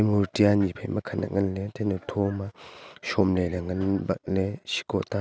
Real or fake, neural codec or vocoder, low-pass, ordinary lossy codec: real; none; none; none